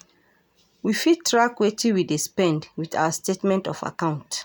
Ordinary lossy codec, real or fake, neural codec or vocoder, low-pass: none; real; none; none